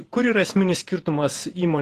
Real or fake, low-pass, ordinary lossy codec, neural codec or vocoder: fake; 14.4 kHz; Opus, 16 kbps; vocoder, 48 kHz, 128 mel bands, Vocos